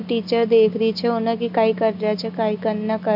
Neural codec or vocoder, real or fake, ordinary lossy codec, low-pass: none; real; none; 5.4 kHz